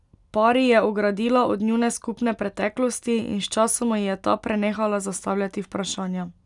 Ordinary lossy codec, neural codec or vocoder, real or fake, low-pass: none; none; real; 10.8 kHz